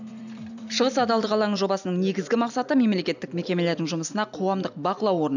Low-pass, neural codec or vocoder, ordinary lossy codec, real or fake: 7.2 kHz; none; none; real